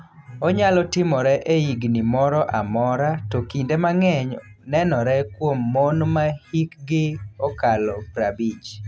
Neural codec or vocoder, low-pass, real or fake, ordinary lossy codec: none; none; real; none